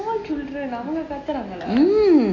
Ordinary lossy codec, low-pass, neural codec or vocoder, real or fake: AAC, 32 kbps; 7.2 kHz; none; real